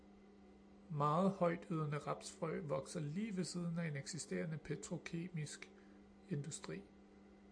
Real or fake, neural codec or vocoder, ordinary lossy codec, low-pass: real; none; AAC, 64 kbps; 9.9 kHz